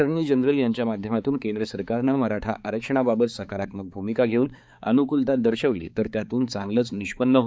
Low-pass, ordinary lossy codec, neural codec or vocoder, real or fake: none; none; codec, 16 kHz, 4 kbps, X-Codec, HuBERT features, trained on balanced general audio; fake